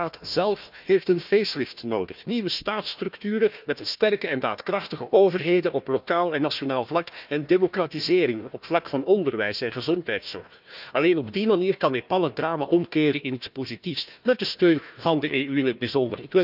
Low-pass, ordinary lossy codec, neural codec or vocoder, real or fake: 5.4 kHz; none; codec, 16 kHz, 1 kbps, FunCodec, trained on Chinese and English, 50 frames a second; fake